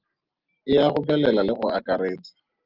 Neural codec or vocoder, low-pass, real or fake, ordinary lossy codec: none; 5.4 kHz; real; Opus, 32 kbps